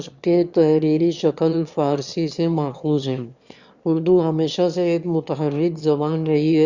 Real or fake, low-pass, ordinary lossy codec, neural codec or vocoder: fake; 7.2 kHz; Opus, 64 kbps; autoencoder, 22.05 kHz, a latent of 192 numbers a frame, VITS, trained on one speaker